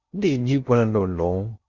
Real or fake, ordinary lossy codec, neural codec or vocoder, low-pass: fake; Opus, 64 kbps; codec, 16 kHz in and 24 kHz out, 0.8 kbps, FocalCodec, streaming, 65536 codes; 7.2 kHz